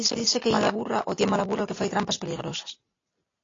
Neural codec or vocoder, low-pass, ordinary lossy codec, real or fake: none; 7.2 kHz; AAC, 32 kbps; real